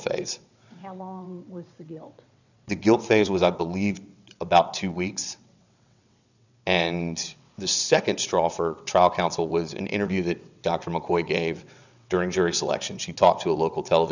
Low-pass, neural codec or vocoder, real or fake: 7.2 kHz; vocoder, 22.05 kHz, 80 mel bands, WaveNeXt; fake